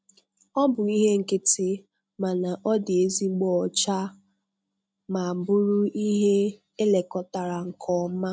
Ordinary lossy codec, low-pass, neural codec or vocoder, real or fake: none; none; none; real